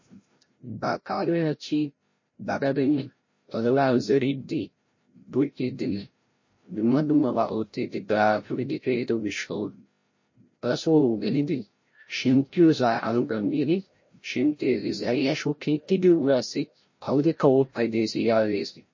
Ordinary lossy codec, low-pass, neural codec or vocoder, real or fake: MP3, 32 kbps; 7.2 kHz; codec, 16 kHz, 0.5 kbps, FreqCodec, larger model; fake